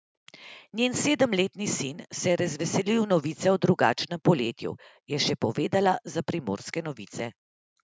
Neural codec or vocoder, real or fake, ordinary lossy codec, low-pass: none; real; none; none